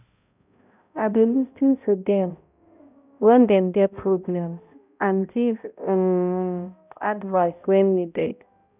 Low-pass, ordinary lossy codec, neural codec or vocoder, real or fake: 3.6 kHz; none; codec, 16 kHz, 0.5 kbps, X-Codec, HuBERT features, trained on balanced general audio; fake